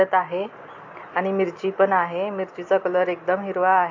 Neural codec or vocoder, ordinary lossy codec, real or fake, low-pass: none; AAC, 48 kbps; real; 7.2 kHz